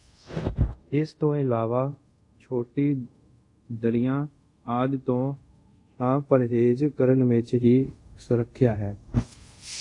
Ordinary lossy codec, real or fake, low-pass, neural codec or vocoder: AAC, 48 kbps; fake; 10.8 kHz; codec, 24 kHz, 0.5 kbps, DualCodec